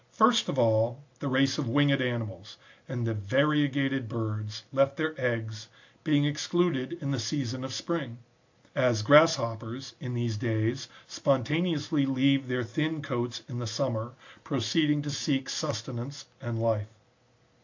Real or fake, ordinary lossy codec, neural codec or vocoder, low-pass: real; AAC, 48 kbps; none; 7.2 kHz